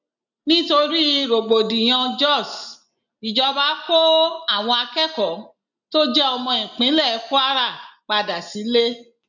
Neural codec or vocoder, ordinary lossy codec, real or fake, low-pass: none; none; real; 7.2 kHz